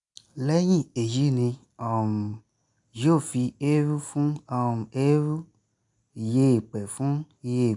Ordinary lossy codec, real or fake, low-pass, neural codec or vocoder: none; real; 10.8 kHz; none